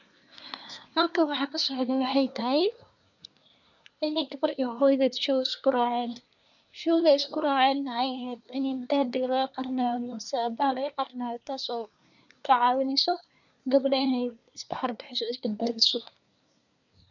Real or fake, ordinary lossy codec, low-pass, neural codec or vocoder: fake; none; 7.2 kHz; codec, 24 kHz, 1 kbps, SNAC